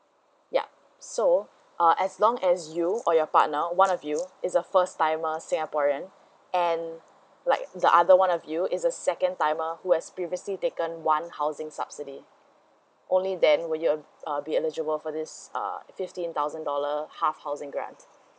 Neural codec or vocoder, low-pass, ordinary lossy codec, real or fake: none; none; none; real